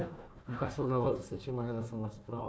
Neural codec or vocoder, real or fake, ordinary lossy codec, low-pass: codec, 16 kHz, 1 kbps, FunCodec, trained on Chinese and English, 50 frames a second; fake; none; none